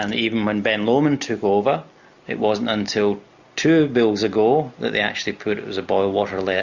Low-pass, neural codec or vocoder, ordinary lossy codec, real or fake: 7.2 kHz; none; Opus, 64 kbps; real